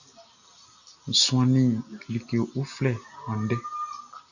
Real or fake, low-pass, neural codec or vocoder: real; 7.2 kHz; none